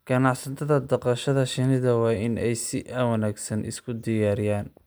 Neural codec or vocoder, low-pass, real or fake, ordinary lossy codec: none; none; real; none